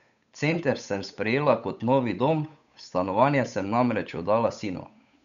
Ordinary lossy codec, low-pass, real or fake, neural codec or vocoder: none; 7.2 kHz; fake; codec, 16 kHz, 8 kbps, FunCodec, trained on Chinese and English, 25 frames a second